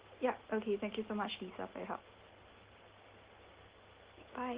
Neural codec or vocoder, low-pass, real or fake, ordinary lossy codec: none; 3.6 kHz; real; Opus, 16 kbps